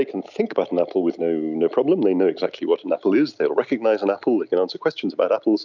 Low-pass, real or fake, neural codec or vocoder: 7.2 kHz; real; none